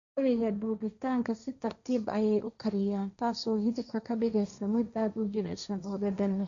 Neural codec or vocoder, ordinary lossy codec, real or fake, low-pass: codec, 16 kHz, 1.1 kbps, Voila-Tokenizer; none; fake; 7.2 kHz